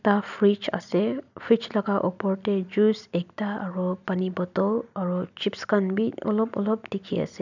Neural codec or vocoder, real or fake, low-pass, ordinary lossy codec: none; real; 7.2 kHz; none